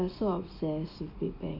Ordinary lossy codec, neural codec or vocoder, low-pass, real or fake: MP3, 32 kbps; none; 5.4 kHz; real